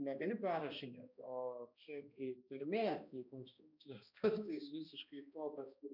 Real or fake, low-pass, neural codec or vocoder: fake; 5.4 kHz; codec, 16 kHz, 1 kbps, X-Codec, HuBERT features, trained on balanced general audio